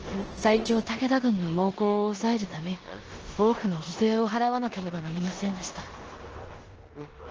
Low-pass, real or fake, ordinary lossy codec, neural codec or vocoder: 7.2 kHz; fake; Opus, 16 kbps; codec, 16 kHz in and 24 kHz out, 0.9 kbps, LongCat-Audio-Codec, four codebook decoder